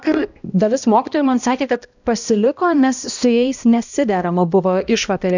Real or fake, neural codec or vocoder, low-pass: fake; codec, 16 kHz, 1 kbps, X-Codec, HuBERT features, trained on balanced general audio; 7.2 kHz